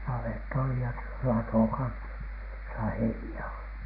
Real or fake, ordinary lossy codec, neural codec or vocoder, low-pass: real; none; none; 5.4 kHz